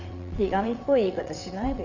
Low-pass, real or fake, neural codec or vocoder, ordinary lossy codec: 7.2 kHz; fake; vocoder, 22.05 kHz, 80 mel bands, WaveNeXt; none